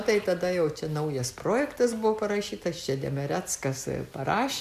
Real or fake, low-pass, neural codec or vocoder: real; 14.4 kHz; none